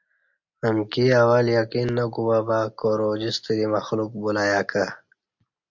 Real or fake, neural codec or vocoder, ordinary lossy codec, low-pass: real; none; MP3, 64 kbps; 7.2 kHz